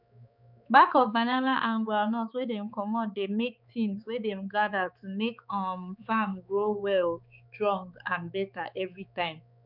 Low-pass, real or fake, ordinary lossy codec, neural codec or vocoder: 5.4 kHz; fake; none; codec, 16 kHz, 4 kbps, X-Codec, HuBERT features, trained on balanced general audio